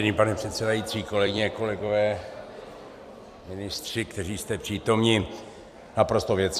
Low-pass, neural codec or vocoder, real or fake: 14.4 kHz; vocoder, 44.1 kHz, 128 mel bands every 256 samples, BigVGAN v2; fake